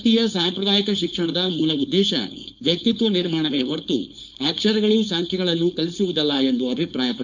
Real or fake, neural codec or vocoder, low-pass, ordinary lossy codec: fake; codec, 16 kHz, 4.8 kbps, FACodec; 7.2 kHz; none